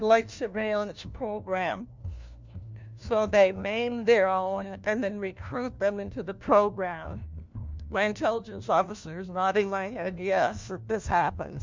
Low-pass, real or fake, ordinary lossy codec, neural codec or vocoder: 7.2 kHz; fake; MP3, 64 kbps; codec, 16 kHz, 1 kbps, FunCodec, trained on LibriTTS, 50 frames a second